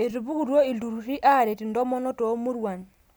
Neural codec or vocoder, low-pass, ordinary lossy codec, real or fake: none; none; none; real